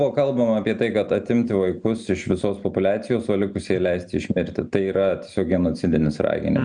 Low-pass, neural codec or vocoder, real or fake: 9.9 kHz; none; real